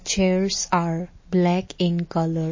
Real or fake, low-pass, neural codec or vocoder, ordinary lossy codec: real; 7.2 kHz; none; MP3, 32 kbps